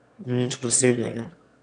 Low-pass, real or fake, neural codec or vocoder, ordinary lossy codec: 9.9 kHz; fake; autoencoder, 22.05 kHz, a latent of 192 numbers a frame, VITS, trained on one speaker; none